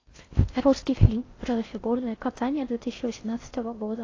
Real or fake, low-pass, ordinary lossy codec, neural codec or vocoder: fake; 7.2 kHz; AAC, 32 kbps; codec, 16 kHz in and 24 kHz out, 0.6 kbps, FocalCodec, streaming, 4096 codes